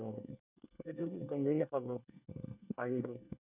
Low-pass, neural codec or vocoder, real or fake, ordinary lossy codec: 3.6 kHz; codec, 24 kHz, 1 kbps, SNAC; fake; none